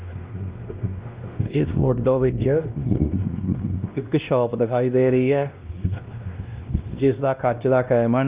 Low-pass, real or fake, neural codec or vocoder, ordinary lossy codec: 3.6 kHz; fake; codec, 16 kHz, 1 kbps, X-Codec, WavLM features, trained on Multilingual LibriSpeech; Opus, 32 kbps